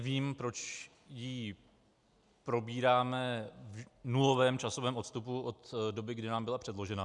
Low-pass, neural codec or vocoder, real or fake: 10.8 kHz; none; real